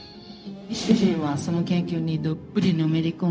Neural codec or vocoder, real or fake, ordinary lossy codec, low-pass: codec, 16 kHz, 0.4 kbps, LongCat-Audio-Codec; fake; none; none